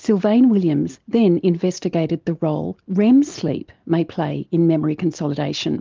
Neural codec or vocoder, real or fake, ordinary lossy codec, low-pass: none; real; Opus, 24 kbps; 7.2 kHz